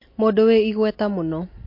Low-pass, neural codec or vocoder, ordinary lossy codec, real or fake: 5.4 kHz; none; MP3, 32 kbps; real